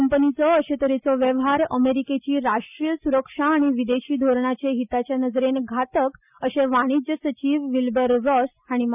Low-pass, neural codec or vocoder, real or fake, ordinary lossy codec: 3.6 kHz; none; real; none